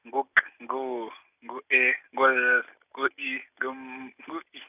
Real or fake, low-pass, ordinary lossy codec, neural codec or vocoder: real; 3.6 kHz; none; none